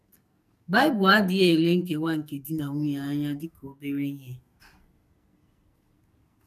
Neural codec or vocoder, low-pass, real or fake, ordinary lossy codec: codec, 44.1 kHz, 2.6 kbps, SNAC; 14.4 kHz; fake; none